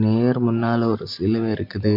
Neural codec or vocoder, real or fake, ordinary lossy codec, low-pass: none; real; MP3, 48 kbps; 5.4 kHz